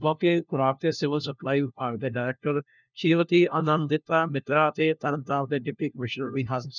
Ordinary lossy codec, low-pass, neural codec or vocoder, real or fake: none; 7.2 kHz; codec, 16 kHz, 1 kbps, FunCodec, trained on LibriTTS, 50 frames a second; fake